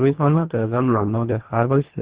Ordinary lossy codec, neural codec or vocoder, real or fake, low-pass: Opus, 16 kbps; codec, 24 kHz, 1.5 kbps, HILCodec; fake; 3.6 kHz